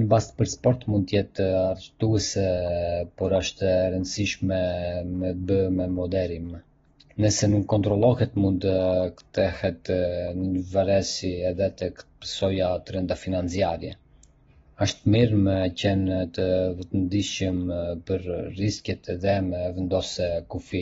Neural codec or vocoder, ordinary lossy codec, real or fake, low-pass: none; AAC, 24 kbps; real; 7.2 kHz